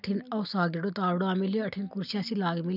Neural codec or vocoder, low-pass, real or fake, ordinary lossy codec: none; 5.4 kHz; real; none